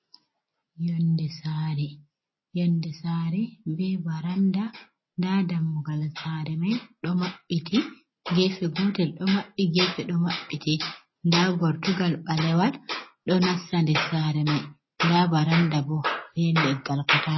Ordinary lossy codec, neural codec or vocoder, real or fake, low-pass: MP3, 24 kbps; none; real; 7.2 kHz